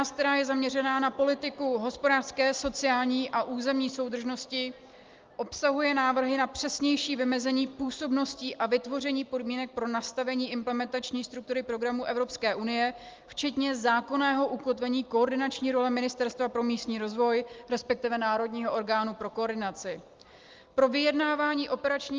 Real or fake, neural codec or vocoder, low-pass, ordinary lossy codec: real; none; 7.2 kHz; Opus, 32 kbps